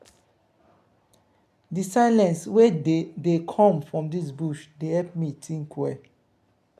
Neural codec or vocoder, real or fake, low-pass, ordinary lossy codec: none; real; 14.4 kHz; none